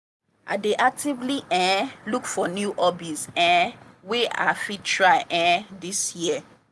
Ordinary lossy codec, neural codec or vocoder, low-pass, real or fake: none; none; none; real